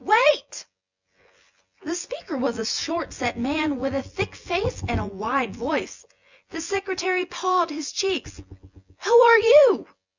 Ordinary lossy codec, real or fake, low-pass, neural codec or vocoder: Opus, 64 kbps; fake; 7.2 kHz; vocoder, 24 kHz, 100 mel bands, Vocos